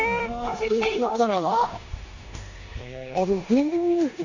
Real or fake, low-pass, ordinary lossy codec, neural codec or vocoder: fake; 7.2 kHz; none; codec, 16 kHz in and 24 kHz out, 0.9 kbps, LongCat-Audio-Codec, four codebook decoder